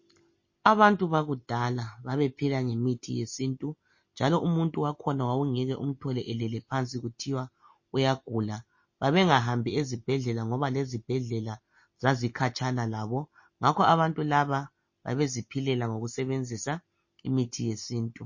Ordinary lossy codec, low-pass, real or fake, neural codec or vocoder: MP3, 32 kbps; 7.2 kHz; real; none